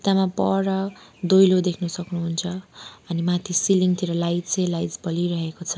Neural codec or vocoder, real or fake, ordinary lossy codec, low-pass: none; real; none; none